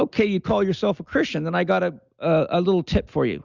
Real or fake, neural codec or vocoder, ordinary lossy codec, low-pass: real; none; Opus, 64 kbps; 7.2 kHz